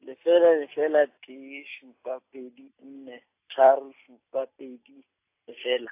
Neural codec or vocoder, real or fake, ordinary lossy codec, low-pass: none; real; AAC, 24 kbps; 3.6 kHz